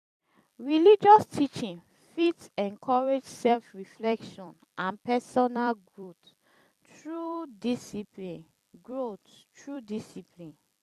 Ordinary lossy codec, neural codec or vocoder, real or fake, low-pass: AAC, 96 kbps; vocoder, 44.1 kHz, 128 mel bands every 256 samples, BigVGAN v2; fake; 14.4 kHz